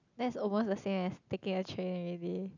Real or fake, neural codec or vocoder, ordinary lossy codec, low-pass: real; none; none; 7.2 kHz